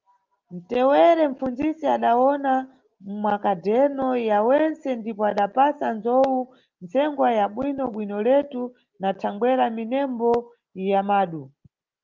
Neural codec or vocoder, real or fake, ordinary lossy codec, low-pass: none; real; Opus, 32 kbps; 7.2 kHz